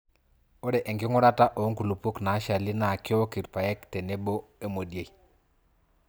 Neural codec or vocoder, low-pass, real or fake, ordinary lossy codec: none; none; real; none